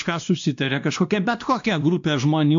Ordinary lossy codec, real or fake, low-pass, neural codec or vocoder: AAC, 64 kbps; fake; 7.2 kHz; codec, 16 kHz, 1 kbps, X-Codec, WavLM features, trained on Multilingual LibriSpeech